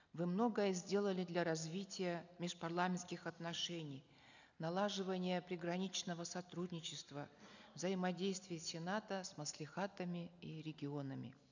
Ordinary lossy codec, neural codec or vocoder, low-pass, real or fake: none; none; 7.2 kHz; real